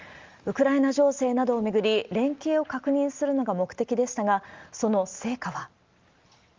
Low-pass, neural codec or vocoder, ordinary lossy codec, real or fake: 7.2 kHz; none; Opus, 32 kbps; real